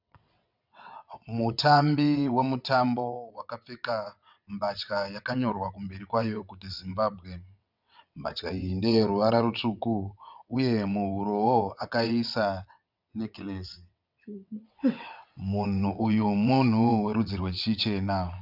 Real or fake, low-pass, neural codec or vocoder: fake; 5.4 kHz; vocoder, 22.05 kHz, 80 mel bands, WaveNeXt